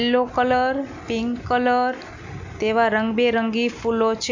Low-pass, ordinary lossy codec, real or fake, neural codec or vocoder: 7.2 kHz; MP3, 48 kbps; real; none